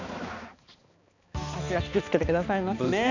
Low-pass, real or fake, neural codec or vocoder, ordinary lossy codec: 7.2 kHz; fake; codec, 16 kHz, 2 kbps, X-Codec, HuBERT features, trained on balanced general audio; none